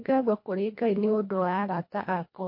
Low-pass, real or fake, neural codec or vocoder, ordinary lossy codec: 5.4 kHz; fake; codec, 24 kHz, 1.5 kbps, HILCodec; MP3, 32 kbps